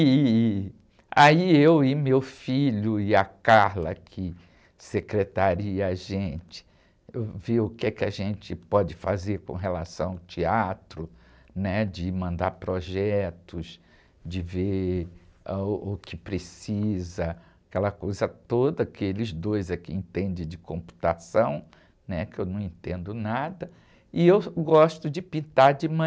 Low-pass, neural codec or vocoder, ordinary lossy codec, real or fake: none; none; none; real